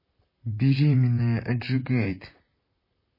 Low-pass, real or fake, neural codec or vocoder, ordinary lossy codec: 5.4 kHz; fake; vocoder, 44.1 kHz, 128 mel bands, Pupu-Vocoder; MP3, 24 kbps